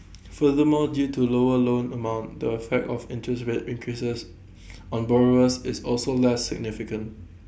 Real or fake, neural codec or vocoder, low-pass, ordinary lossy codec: real; none; none; none